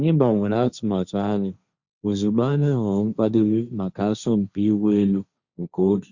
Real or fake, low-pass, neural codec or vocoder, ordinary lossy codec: fake; 7.2 kHz; codec, 16 kHz, 1.1 kbps, Voila-Tokenizer; Opus, 64 kbps